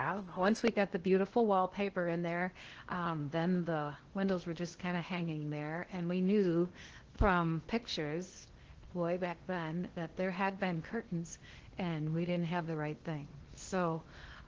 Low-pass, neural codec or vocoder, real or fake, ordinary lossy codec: 7.2 kHz; codec, 16 kHz in and 24 kHz out, 0.8 kbps, FocalCodec, streaming, 65536 codes; fake; Opus, 16 kbps